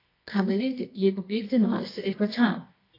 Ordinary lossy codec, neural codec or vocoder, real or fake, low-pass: AAC, 24 kbps; codec, 24 kHz, 0.9 kbps, WavTokenizer, medium music audio release; fake; 5.4 kHz